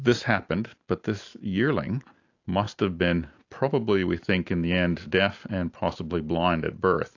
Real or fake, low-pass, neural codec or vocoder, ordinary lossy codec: real; 7.2 kHz; none; AAC, 48 kbps